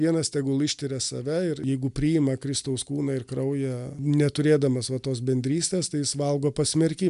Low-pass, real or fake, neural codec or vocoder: 10.8 kHz; real; none